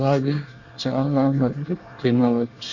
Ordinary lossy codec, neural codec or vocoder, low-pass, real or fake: Opus, 64 kbps; codec, 24 kHz, 1 kbps, SNAC; 7.2 kHz; fake